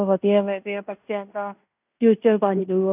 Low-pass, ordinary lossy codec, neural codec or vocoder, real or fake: 3.6 kHz; none; codec, 16 kHz in and 24 kHz out, 0.9 kbps, LongCat-Audio-Codec, fine tuned four codebook decoder; fake